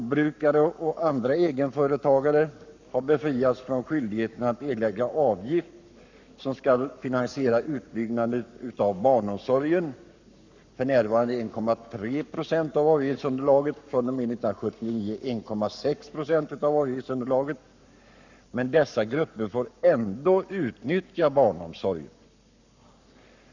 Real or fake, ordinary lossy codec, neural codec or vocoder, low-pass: fake; none; codec, 44.1 kHz, 7.8 kbps, Pupu-Codec; 7.2 kHz